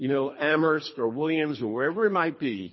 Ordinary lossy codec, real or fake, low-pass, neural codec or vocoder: MP3, 24 kbps; fake; 7.2 kHz; codec, 24 kHz, 3 kbps, HILCodec